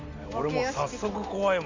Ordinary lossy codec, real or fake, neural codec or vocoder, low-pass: AAC, 48 kbps; real; none; 7.2 kHz